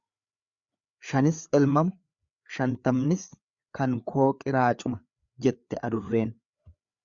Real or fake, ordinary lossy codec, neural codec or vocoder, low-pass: fake; Opus, 64 kbps; codec, 16 kHz, 4 kbps, FreqCodec, larger model; 7.2 kHz